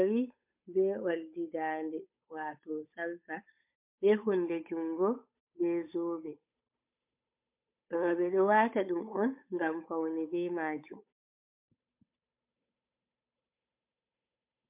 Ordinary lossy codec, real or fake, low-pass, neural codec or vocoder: MP3, 32 kbps; fake; 3.6 kHz; codec, 16 kHz, 8 kbps, FunCodec, trained on Chinese and English, 25 frames a second